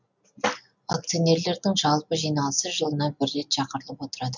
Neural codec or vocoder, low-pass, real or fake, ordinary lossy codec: none; 7.2 kHz; real; none